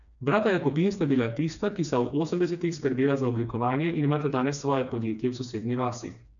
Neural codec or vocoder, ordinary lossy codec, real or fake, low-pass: codec, 16 kHz, 2 kbps, FreqCodec, smaller model; none; fake; 7.2 kHz